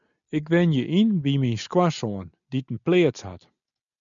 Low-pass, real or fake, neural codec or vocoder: 7.2 kHz; real; none